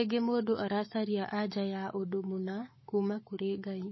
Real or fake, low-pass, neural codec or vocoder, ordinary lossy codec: fake; 7.2 kHz; codec, 44.1 kHz, 7.8 kbps, Pupu-Codec; MP3, 24 kbps